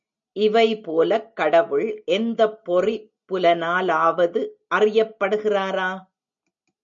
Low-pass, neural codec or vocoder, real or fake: 7.2 kHz; none; real